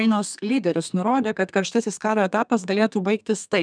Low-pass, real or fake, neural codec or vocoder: 9.9 kHz; fake; codec, 32 kHz, 1.9 kbps, SNAC